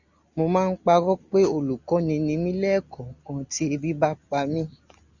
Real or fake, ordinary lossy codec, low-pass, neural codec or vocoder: real; Opus, 64 kbps; 7.2 kHz; none